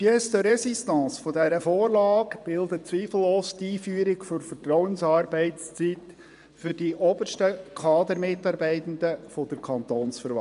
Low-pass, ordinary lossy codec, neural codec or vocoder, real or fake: 10.8 kHz; MP3, 96 kbps; vocoder, 24 kHz, 100 mel bands, Vocos; fake